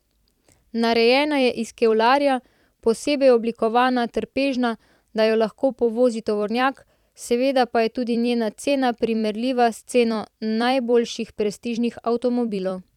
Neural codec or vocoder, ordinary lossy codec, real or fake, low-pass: vocoder, 44.1 kHz, 128 mel bands every 256 samples, BigVGAN v2; none; fake; 19.8 kHz